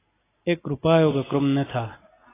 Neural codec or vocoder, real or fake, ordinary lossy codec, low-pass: none; real; AAC, 16 kbps; 3.6 kHz